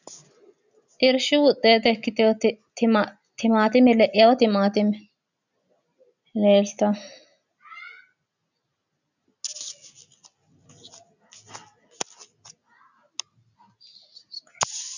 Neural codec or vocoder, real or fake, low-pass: none; real; 7.2 kHz